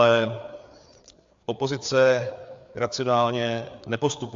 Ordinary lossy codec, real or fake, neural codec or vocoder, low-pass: AAC, 64 kbps; fake; codec, 16 kHz, 4 kbps, FreqCodec, larger model; 7.2 kHz